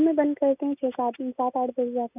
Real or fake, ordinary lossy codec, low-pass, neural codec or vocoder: real; none; 3.6 kHz; none